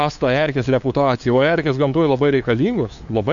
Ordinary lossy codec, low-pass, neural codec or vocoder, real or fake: Opus, 64 kbps; 7.2 kHz; codec, 16 kHz, 2 kbps, FunCodec, trained on LibriTTS, 25 frames a second; fake